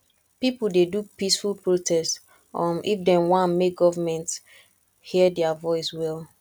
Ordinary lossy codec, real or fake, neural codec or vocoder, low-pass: none; real; none; 19.8 kHz